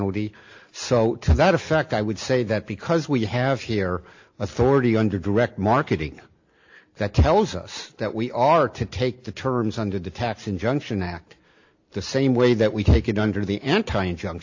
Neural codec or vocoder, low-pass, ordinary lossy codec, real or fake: none; 7.2 kHz; AAC, 48 kbps; real